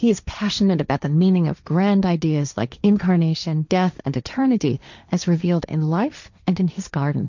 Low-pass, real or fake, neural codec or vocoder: 7.2 kHz; fake; codec, 16 kHz, 1.1 kbps, Voila-Tokenizer